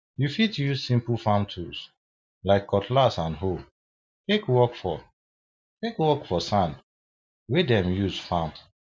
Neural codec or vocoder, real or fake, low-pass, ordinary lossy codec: none; real; none; none